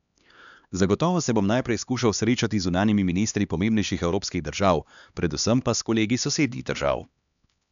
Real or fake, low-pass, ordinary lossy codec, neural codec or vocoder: fake; 7.2 kHz; none; codec, 16 kHz, 2 kbps, X-Codec, HuBERT features, trained on LibriSpeech